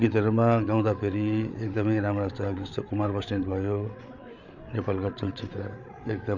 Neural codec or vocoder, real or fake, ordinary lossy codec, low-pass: codec, 16 kHz, 8 kbps, FreqCodec, larger model; fake; none; 7.2 kHz